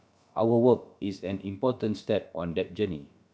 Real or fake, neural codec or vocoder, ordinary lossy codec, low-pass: fake; codec, 16 kHz, about 1 kbps, DyCAST, with the encoder's durations; none; none